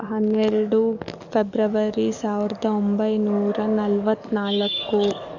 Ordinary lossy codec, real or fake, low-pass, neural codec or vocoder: none; fake; 7.2 kHz; codec, 44.1 kHz, 7.8 kbps, DAC